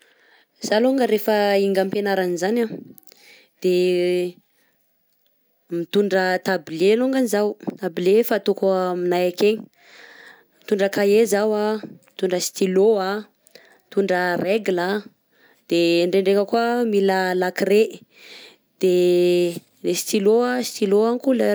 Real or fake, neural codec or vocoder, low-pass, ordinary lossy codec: real; none; none; none